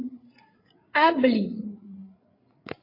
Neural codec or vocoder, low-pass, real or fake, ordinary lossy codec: codec, 16 kHz, 16 kbps, FreqCodec, larger model; 5.4 kHz; fake; AAC, 24 kbps